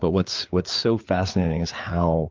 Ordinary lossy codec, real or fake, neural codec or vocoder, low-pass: Opus, 32 kbps; fake; codec, 16 kHz, 4 kbps, FreqCodec, larger model; 7.2 kHz